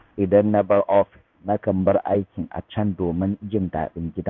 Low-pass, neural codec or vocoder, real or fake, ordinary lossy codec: 7.2 kHz; codec, 16 kHz in and 24 kHz out, 1 kbps, XY-Tokenizer; fake; none